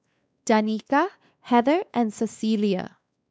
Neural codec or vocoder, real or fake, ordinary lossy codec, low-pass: codec, 16 kHz, 2 kbps, X-Codec, WavLM features, trained on Multilingual LibriSpeech; fake; none; none